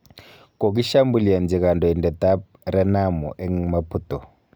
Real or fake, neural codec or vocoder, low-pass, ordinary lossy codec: real; none; none; none